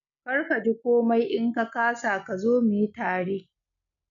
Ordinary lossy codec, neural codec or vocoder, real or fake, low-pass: none; none; real; 7.2 kHz